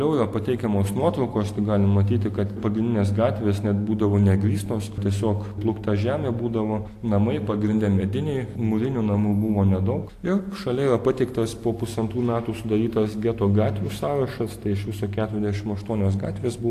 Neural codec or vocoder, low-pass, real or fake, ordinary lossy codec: none; 14.4 kHz; real; AAC, 64 kbps